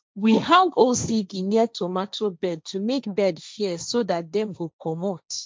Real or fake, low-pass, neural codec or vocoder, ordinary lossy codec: fake; none; codec, 16 kHz, 1.1 kbps, Voila-Tokenizer; none